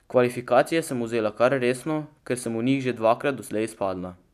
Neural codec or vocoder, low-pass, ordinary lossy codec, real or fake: none; 14.4 kHz; none; real